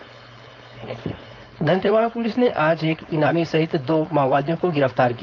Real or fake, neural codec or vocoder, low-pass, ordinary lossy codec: fake; codec, 16 kHz, 4.8 kbps, FACodec; 7.2 kHz; none